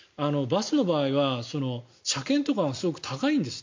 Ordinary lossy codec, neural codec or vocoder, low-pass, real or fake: MP3, 48 kbps; none; 7.2 kHz; real